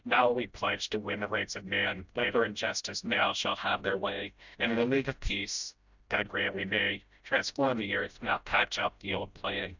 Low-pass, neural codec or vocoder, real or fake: 7.2 kHz; codec, 16 kHz, 0.5 kbps, FreqCodec, smaller model; fake